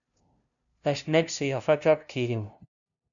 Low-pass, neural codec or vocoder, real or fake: 7.2 kHz; codec, 16 kHz, 0.5 kbps, FunCodec, trained on LibriTTS, 25 frames a second; fake